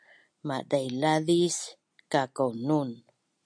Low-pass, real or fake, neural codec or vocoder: 9.9 kHz; real; none